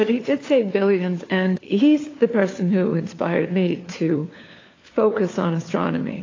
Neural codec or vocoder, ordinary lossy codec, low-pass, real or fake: codec, 16 kHz, 4 kbps, FunCodec, trained on LibriTTS, 50 frames a second; AAC, 32 kbps; 7.2 kHz; fake